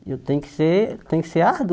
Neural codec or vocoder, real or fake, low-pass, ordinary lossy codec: none; real; none; none